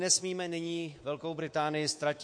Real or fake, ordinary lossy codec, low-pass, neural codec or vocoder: fake; MP3, 48 kbps; 9.9 kHz; codec, 24 kHz, 3.1 kbps, DualCodec